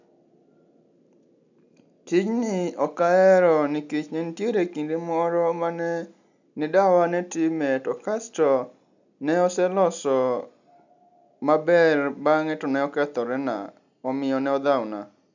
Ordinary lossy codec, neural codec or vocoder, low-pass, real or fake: none; none; 7.2 kHz; real